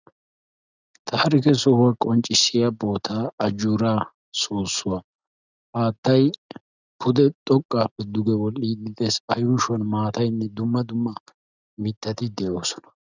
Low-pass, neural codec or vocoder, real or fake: 7.2 kHz; none; real